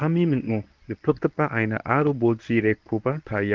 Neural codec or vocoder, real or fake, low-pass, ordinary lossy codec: codec, 24 kHz, 0.9 kbps, WavTokenizer, medium speech release version 1; fake; 7.2 kHz; Opus, 24 kbps